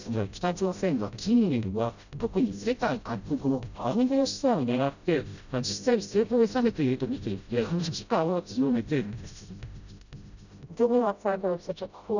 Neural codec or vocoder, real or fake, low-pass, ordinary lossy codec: codec, 16 kHz, 0.5 kbps, FreqCodec, smaller model; fake; 7.2 kHz; none